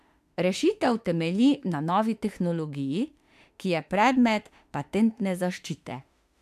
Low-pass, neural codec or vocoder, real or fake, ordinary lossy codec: 14.4 kHz; autoencoder, 48 kHz, 32 numbers a frame, DAC-VAE, trained on Japanese speech; fake; AAC, 96 kbps